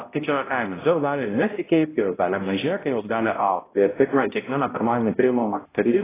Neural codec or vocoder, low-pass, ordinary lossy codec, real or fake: codec, 16 kHz, 0.5 kbps, X-Codec, HuBERT features, trained on balanced general audio; 3.6 kHz; AAC, 16 kbps; fake